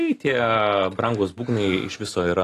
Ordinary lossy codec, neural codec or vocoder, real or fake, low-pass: AAC, 48 kbps; none; real; 14.4 kHz